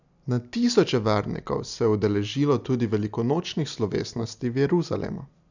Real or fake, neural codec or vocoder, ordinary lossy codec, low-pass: real; none; none; 7.2 kHz